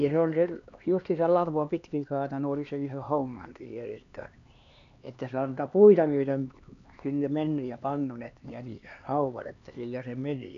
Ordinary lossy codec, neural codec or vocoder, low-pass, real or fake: MP3, 64 kbps; codec, 16 kHz, 2 kbps, X-Codec, HuBERT features, trained on LibriSpeech; 7.2 kHz; fake